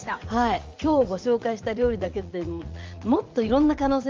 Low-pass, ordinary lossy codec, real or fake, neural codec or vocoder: 7.2 kHz; Opus, 32 kbps; fake; vocoder, 44.1 kHz, 80 mel bands, Vocos